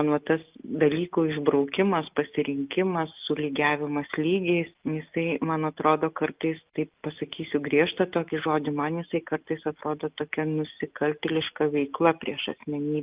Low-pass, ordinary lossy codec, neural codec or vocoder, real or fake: 3.6 kHz; Opus, 24 kbps; none; real